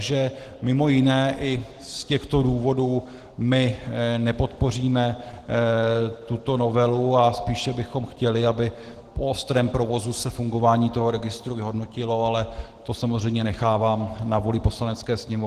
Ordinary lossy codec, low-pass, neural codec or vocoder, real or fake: Opus, 16 kbps; 14.4 kHz; none; real